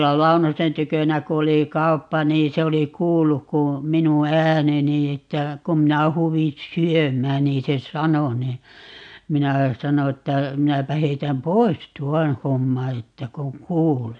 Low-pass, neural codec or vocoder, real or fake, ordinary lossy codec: 9.9 kHz; none; real; AAC, 64 kbps